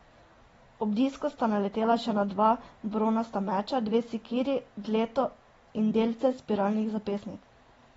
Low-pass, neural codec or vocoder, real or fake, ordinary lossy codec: 19.8 kHz; none; real; AAC, 24 kbps